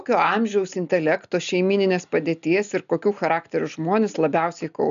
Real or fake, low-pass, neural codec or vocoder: real; 7.2 kHz; none